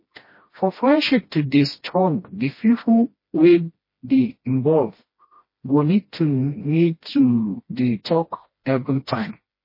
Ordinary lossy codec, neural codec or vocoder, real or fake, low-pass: MP3, 24 kbps; codec, 16 kHz, 1 kbps, FreqCodec, smaller model; fake; 5.4 kHz